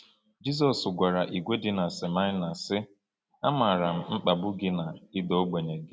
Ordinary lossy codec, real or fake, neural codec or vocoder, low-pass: none; real; none; none